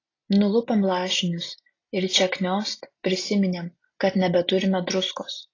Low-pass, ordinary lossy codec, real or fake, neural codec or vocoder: 7.2 kHz; AAC, 32 kbps; real; none